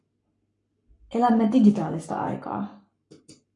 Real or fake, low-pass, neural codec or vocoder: fake; 10.8 kHz; codec, 44.1 kHz, 7.8 kbps, Pupu-Codec